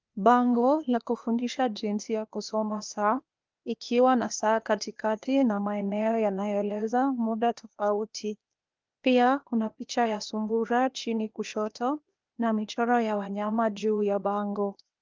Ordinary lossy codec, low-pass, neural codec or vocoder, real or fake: Opus, 32 kbps; 7.2 kHz; codec, 16 kHz, 0.8 kbps, ZipCodec; fake